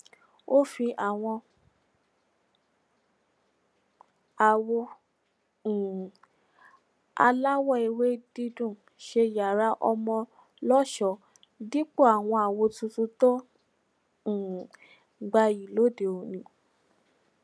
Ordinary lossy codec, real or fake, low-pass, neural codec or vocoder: none; real; none; none